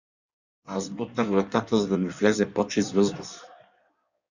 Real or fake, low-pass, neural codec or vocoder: fake; 7.2 kHz; codec, 16 kHz in and 24 kHz out, 1.1 kbps, FireRedTTS-2 codec